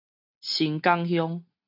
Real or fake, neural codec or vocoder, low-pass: real; none; 5.4 kHz